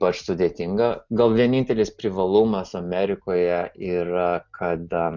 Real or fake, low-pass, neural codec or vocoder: real; 7.2 kHz; none